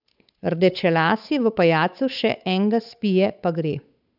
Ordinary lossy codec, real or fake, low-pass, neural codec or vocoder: none; fake; 5.4 kHz; codec, 16 kHz, 8 kbps, FunCodec, trained on Chinese and English, 25 frames a second